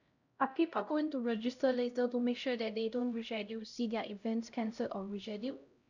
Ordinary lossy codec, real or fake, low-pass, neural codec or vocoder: none; fake; 7.2 kHz; codec, 16 kHz, 0.5 kbps, X-Codec, HuBERT features, trained on LibriSpeech